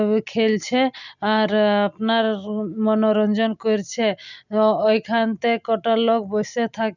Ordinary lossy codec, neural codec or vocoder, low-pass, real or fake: none; none; 7.2 kHz; real